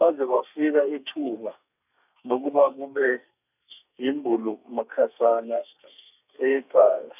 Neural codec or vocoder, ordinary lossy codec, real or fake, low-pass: codec, 32 kHz, 1.9 kbps, SNAC; none; fake; 3.6 kHz